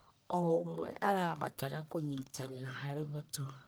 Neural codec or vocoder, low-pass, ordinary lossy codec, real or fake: codec, 44.1 kHz, 1.7 kbps, Pupu-Codec; none; none; fake